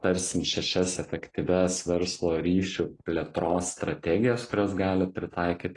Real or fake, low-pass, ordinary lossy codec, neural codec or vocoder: real; 10.8 kHz; AAC, 32 kbps; none